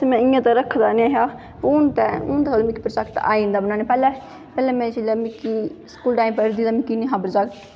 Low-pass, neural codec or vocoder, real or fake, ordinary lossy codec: none; none; real; none